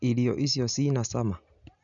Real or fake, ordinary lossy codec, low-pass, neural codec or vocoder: real; none; 7.2 kHz; none